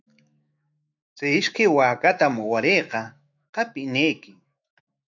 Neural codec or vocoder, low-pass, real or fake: autoencoder, 48 kHz, 128 numbers a frame, DAC-VAE, trained on Japanese speech; 7.2 kHz; fake